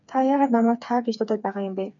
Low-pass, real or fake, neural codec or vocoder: 7.2 kHz; fake; codec, 16 kHz, 4 kbps, FreqCodec, smaller model